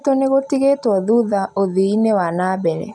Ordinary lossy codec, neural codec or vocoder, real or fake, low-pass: none; none; real; none